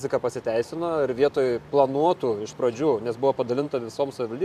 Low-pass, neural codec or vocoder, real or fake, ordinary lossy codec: 14.4 kHz; vocoder, 44.1 kHz, 128 mel bands every 512 samples, BigVGAN v2; fake; AAC, 96 kbps